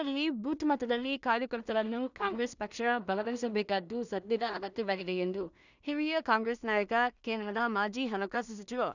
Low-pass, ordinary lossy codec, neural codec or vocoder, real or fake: 7.2 kHz; none; codec, 16 kHz in and 24 kHz out, 0.4 kbps, LongCat-Audio-Codec, two codebook decoder; fake